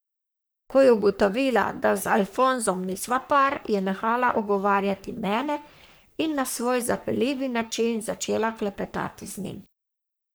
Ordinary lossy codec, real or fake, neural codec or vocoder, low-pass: none; fake; codec, 44.1 kHz, 3.4 kbps, Pupu-Codec; none